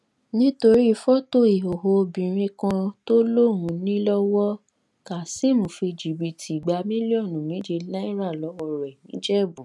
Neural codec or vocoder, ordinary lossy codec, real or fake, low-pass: none; none; real; none